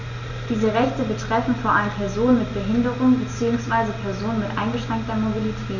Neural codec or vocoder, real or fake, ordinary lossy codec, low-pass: none; real; none; 7.2 kHz